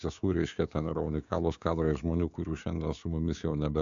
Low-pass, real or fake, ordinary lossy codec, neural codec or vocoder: 7.2 kHz; fake; AAC, 64 kbps; codec, 16 kHz, 6 kbps, DAC